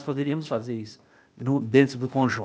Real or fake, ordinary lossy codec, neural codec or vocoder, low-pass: fake; none; codec, 16 kHz, 0.8 kbps, ZipCodec; none